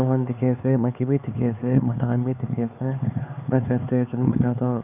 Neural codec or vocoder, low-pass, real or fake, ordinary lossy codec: codec, 16 kHz, 4 kbps, X-Codec, HuBERT features, trained on LibriSpeech; 3.6 kHz; fake; none